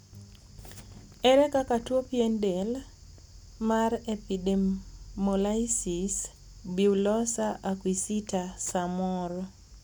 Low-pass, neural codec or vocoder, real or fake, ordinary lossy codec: none; none; real; none